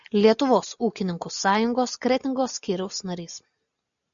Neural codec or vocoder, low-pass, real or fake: none; 7.2 kHz; real